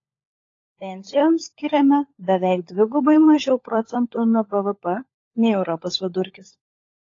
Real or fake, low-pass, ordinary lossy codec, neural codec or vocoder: fake; 7.2 kHz; AAC, 32 kbps; codec, 16 kHz, 16 kbps, FunCodec, trained on LibriTTS, 50 frames a second